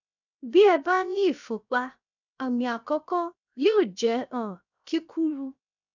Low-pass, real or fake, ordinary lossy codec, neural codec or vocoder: 7.2 kHz; fake; none; codec, 16 kHz, 0.7 kbps, FocalCodec